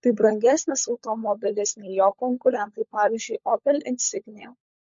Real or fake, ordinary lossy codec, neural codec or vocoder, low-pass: fake; MP3, 48 kbps; codec, 16 kHz, 16 kbps, FunCodec, trained on LibriTTS, 50 frames a second; 7.2 kHz